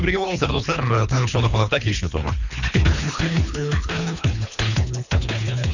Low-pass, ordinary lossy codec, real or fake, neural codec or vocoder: 7.2 kHz; none; fake; codec, 24 kHz, 3 kbps, HILCodec